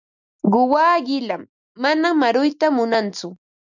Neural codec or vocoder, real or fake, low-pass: none; real; 7.2 kHz